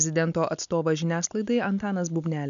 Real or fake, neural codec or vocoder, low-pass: real; none; 7.2 kHz